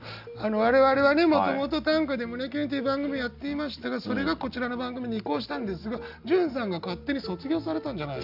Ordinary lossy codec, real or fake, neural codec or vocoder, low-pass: none; real; none; 5.4 kHz